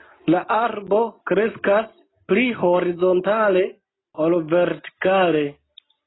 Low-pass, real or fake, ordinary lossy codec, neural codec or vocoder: 7.2 kHz; real; AAC, 16 kbps; none